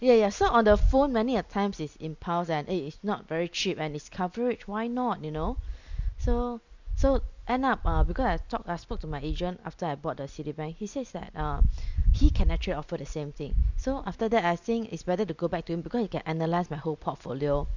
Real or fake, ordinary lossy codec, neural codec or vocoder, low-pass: real; none; none; 7.2 kHz